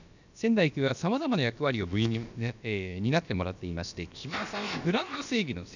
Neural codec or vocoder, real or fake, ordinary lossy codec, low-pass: codec, 16 kHz, about 1 kbps, DyCAST, with the encoder's durations; fake; none; 7.2 kHz